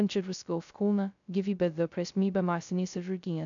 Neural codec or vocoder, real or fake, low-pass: codec, 16 kHz, 0.2 kbps, FocalCodec; fake; 7.2 kHz